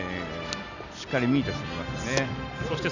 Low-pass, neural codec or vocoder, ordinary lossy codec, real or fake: 7.2 kHz; none; none; real